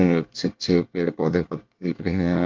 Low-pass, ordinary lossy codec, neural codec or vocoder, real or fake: 7.2 kHz; Opus, 32 kbps; codec, 24 kHz, 1 kbps, SNAC; fake